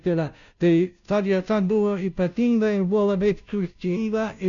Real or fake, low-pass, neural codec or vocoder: fake; 7.2 kHz; codec, 16 kHz, 0.5 kbps, FunCodec, trained on Chinese and English, 25 frames a second